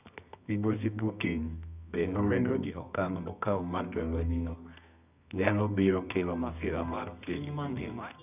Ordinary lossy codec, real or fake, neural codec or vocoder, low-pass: none; fake; codec, 24 kHz, 0.9 kbps, WavTokenizer, medium music audio release; 3.6 kHz